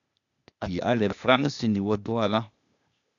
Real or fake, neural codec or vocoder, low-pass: fake; codec, 16 kHz, 0.8 kbps, ZipCodec; 7.2 kHz